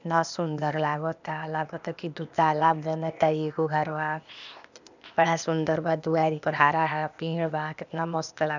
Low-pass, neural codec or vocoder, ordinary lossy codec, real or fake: 7.2 kHz; codec, 16 kHz, 0.8 kbps, ZipCodec; none; fake